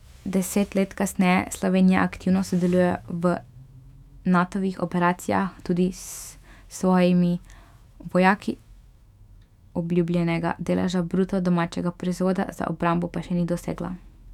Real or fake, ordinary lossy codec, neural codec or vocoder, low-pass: fake; none; autoencoder, 48 kHz, 128 numbers a frame, DAC-VAE, trained on Japanese speech; 19.8 kHz